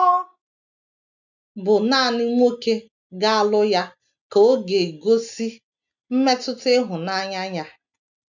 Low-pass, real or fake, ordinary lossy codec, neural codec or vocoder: 7.2 kHz; real; none; none